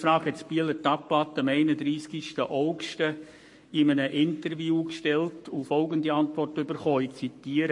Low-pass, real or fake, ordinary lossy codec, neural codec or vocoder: 10.8 kHz; fake; MP3, 48 kbps; codec, 44.1 kHz, 7.8 kbps, Pupu-Codec